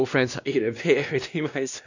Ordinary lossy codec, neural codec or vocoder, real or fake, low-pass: none; codec, 16 kHz, 1 kbps, X-Codec, WavLM features, trained on Multilingual LibriSpeech; fake; 7.2 kHz